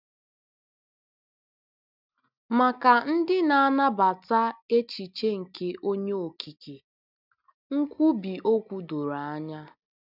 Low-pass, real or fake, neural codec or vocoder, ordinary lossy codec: 5.4 kHz; real; none; none